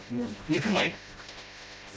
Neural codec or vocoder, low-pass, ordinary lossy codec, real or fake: codec, 16 kHz, 0.5 kbps, FreqCodec, smaller model; none; none; fake